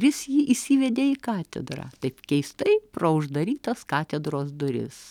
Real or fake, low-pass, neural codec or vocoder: real; 19.8 kHz; none